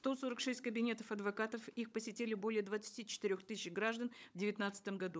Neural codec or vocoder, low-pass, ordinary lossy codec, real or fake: codec, 16 kHz, 16 kbps, FunCodec, trained on LibriTTS, 50 frames a second; none; none; fake